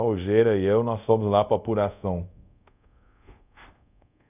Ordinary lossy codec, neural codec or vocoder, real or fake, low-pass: none; codec, 24 kHz, 0.5 kbps, DualCodec; fake; 3.6 kHz